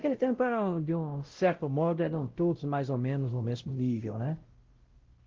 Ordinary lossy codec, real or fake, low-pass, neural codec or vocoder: Opus, 16 kbps; fake; 7.2 kHz; codec, 16 kHz, 0.5 kbps, X-Codec, WavLM features, trained on Multilingual LibriSpeech